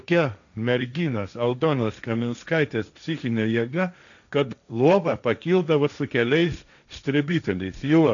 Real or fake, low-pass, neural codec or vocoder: fake; 7.2 kHz; codec, 16 kHz, 1.1 kbps, Voila-Tokenizer